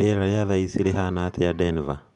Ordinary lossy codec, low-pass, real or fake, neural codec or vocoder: none; 10.8 kHz; fake; vocoder, 24 kHz, 100 mel bands, Vocos